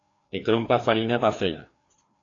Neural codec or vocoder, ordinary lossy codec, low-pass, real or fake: codec, 16 kHz, 2 kbps, FreqCodec, larger model; AAC, 48 kbps; 7.2 kHz; fake